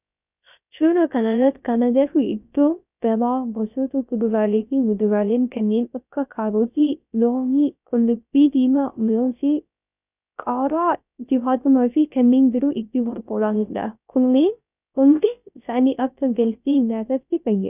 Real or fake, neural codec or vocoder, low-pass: fake; codec, 16 kHz, 0.3 kbps, FocalCodec; 3.6 kHz